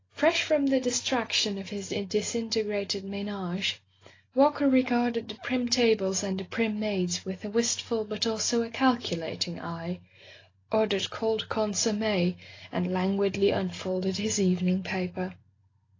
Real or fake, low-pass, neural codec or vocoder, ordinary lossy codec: real; 7.2 kHz; none; AAC, 32 kbps